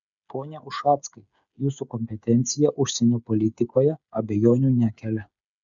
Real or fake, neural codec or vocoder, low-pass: fake; codec, 16 kHz, 8 kbps, FreqCodec, smaller model; 7.2 kHz